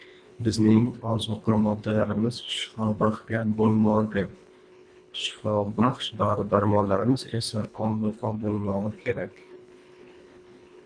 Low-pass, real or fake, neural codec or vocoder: 9.9 kHz; fake; codec, 24 kHz, 1.5 kbps, HILCodec